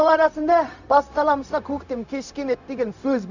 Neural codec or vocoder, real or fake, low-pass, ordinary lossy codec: codec, 16 kHz, 0.4 kbps, LongCat-Audio-Codec; fake; 7.2 kHz; none